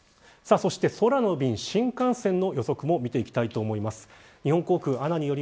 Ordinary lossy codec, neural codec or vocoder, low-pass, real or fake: none; none; none; real